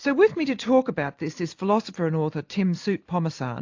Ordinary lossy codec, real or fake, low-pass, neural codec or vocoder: AAC, 48 kbps; real; 7.2 kHz; none